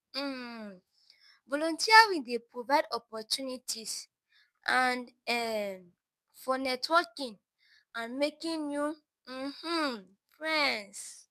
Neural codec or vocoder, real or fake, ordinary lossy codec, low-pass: codec, 44.1 kHz, 7.8 kbps, DAC; fake; none; 14.4 kHz